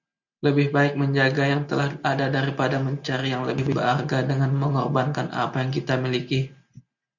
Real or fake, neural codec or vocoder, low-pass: real; none; 7.2 kHz